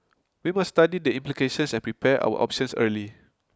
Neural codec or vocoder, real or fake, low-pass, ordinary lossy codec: none; real; none; none